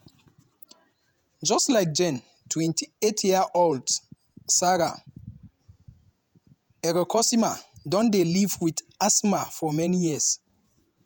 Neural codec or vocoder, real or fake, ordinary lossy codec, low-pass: vocoder, 48 kHz, 128 mel bands, Vocos; fake; none; none